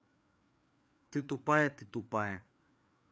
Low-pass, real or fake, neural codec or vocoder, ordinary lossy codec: none; fake; codec, 16 kHz, 4 kbps, FreqCodec, larger model; none